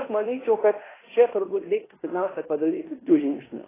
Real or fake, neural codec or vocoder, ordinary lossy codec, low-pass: fake; codec, 16 kHz in and 24 kHz out, 0.9 kbps, LongCat-Audio-Codec, fine tuned four codebook decoder; AAC, 16 kbps; 3.6 kHz